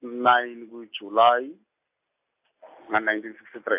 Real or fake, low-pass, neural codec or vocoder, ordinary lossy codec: real; 3.6 kHz; none; AAC, 32 kbps